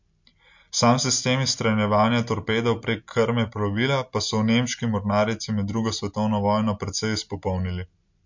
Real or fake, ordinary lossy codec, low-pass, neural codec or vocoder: real; MP3, 48 kbps; 7.2 kHz; none